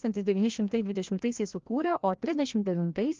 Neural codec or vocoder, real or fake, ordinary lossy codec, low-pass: codec, 16 kHz, 1 kbps, FreqCodec, larger model; fake; Opus, 32 kbps; 7.2 kHz